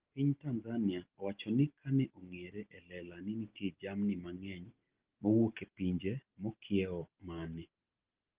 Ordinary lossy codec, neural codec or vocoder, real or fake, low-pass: Opus, 16 kbps; none; real; 3.6 kHz